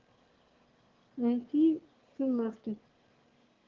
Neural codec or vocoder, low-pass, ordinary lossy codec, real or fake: autoencoder, 22.05 kHz, a latent of 192 numbers a frame, VITS, trained on one speaker; 7.2 kHz; Opus, 16 kbps; fake